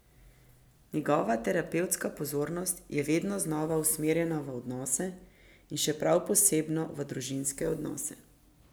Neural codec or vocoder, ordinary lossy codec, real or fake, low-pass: none; none; real; none